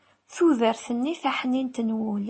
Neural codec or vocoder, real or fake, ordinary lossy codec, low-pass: none; real; MP3, 32 kbps; 9.9 kHz